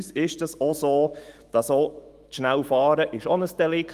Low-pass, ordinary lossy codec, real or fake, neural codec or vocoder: 14.4 kHz; Opus, 24 kbps; fake; autoencoder, 48 kHz, 128 numbers a frame, DAC-VAE, trained on Japanese speech